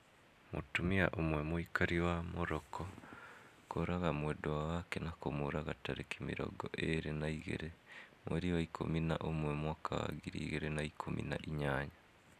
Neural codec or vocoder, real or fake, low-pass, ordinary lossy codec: none; real; 14.4 kHz; none